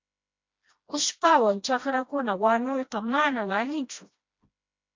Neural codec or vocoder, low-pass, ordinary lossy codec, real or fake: codec, 16 kHz, 1 kbps, FreqCodec, smaller model; 7.2 kHz; MP3, 48 kbps; fake